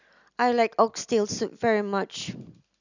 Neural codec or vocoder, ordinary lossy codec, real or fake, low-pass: none; none; real; 7.2 kHz